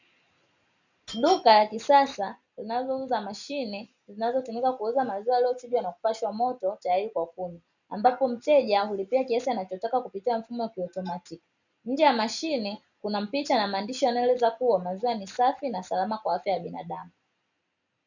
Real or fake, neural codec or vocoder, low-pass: real; none; 7.2 kHz